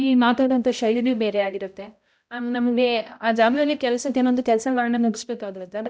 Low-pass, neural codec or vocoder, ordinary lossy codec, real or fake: none; codec, 16 kHz, 0.5 kbps, X-Codec, HuBERT features, trained on balanced general audio; none; fake